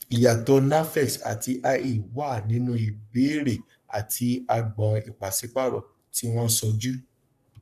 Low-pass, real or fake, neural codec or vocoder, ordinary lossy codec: 14.4 kHz; fake; codec, 44.1 kHz, 3.4 kbps, Pupu-Codec; none